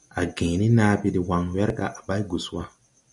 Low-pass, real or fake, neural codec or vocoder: 10.8 kHz; real; none